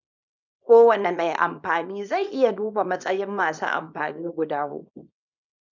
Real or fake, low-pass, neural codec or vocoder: fake; 7.2 kHz; codec, 24 kHz, 0.9 kbps, WavTokenizer, small release